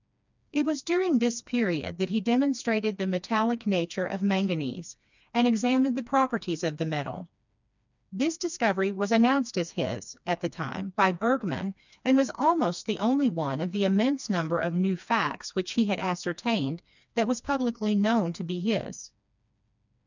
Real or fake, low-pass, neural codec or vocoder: fake; 7.2 kHz; codec, 16 kHz, 2 kbps, FreqCodec, smaller model